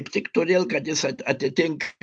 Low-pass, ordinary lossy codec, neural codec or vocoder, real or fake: 9.9 kHz; AAC, 64 kbps; none; real